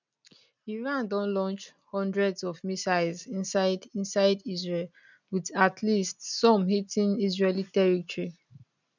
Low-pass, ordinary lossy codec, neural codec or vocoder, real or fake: 7.2 kHz; none; none; real